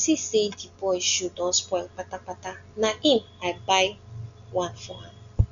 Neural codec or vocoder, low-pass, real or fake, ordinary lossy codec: none; 7.2 kHz; real; none